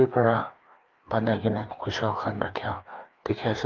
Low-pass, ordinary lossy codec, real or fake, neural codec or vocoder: 7.2 kHz; Opus, 32 kbps; fake; codec, 16 kHz, 2 kbps, FreqCodec, larger model